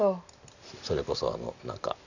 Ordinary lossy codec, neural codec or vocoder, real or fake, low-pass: none; none; real; 7.2 kHz